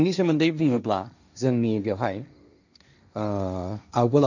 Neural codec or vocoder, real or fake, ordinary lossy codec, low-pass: codec, 16 kHz, 1.1 kbps, Voila-Tokenizer; fake; none; 7.2 kHz